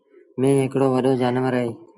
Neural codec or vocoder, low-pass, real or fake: none; 10.8 kHz; real